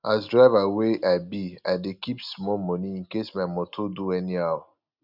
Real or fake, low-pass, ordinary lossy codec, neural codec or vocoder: real; 5.4 kHz; Opus, 64 kbps; none